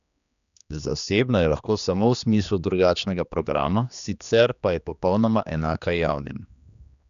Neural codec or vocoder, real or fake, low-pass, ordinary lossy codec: codec, 16 kHz, 2 kbps, X-Codec, HuBERT features, trained on general audio; fake; 7.2 kHz; none